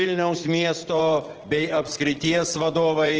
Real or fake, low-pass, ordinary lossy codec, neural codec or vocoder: fake; 7.2 kHz; Opus, 16 kbps; vocoder, 44.1 kHz, 80 mel bands, Vocos